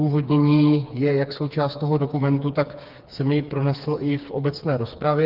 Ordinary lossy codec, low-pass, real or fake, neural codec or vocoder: Opus, 32 kbps; 5.4 kHz; fake; codec, 16 kHz, 4 kbps, FreqCodec, smaller model